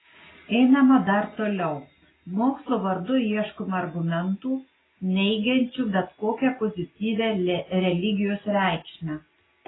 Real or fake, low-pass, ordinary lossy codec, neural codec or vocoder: real; 7.2 kHz; AAC, 16 kbps; none